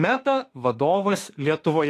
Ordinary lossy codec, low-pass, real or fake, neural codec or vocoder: AAC, 48 kbps; 14.4 kHz; fake; autoencoder, 48 kHz, 32 numbers a frame, DAC-VAE, trained on Japanese speech